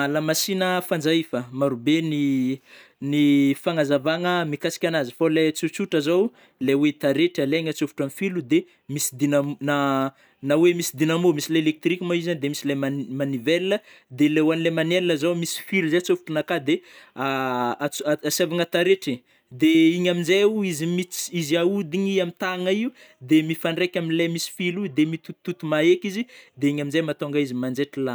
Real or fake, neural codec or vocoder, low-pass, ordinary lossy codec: real; none; none; none